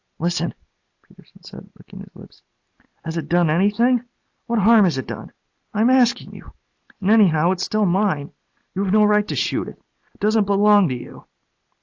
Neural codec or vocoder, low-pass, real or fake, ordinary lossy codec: none; 7.2 kHz; real; AAC, 48 kbps